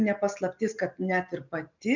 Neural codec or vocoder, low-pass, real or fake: none; 7.2 kHz; real